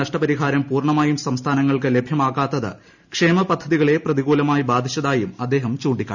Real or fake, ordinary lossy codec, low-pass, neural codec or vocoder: real; none; 7.2 kHz; none